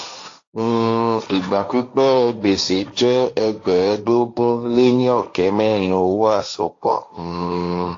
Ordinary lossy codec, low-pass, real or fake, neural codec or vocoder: AAC, 48 kbps; 7.2 kHz; fake; codec, 16 kHz, 1.1 kbps, Voila-Tokenizer